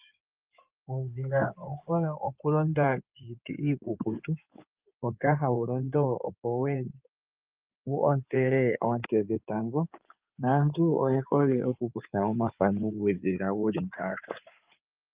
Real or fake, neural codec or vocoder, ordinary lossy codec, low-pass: fake; codec, 16 kHz in and 24 kHz out, 2.2 kbps, FireRedTTS-2 codec; Opus, 24 kbps; 3.6 kHz